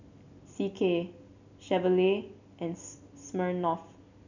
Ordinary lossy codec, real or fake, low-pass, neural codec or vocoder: none; real; 7.2 kHz; none